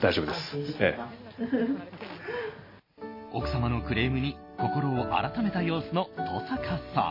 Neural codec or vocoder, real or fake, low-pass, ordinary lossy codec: none; real; 5.4 kHz; MP3, 24 kbps